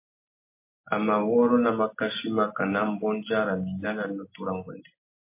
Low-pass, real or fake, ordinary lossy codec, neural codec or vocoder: 3.6 kHz; real; MP3, 24 kbps; none